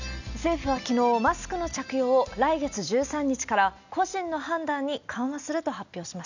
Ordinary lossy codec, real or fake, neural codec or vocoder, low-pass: none; real; none; 7.2 kHz